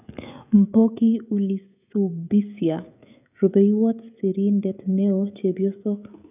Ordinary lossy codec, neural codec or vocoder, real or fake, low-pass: none; codec, 16 kHz, 16 kbps, FreqCodec, smaller model; fake; 3.6 kHz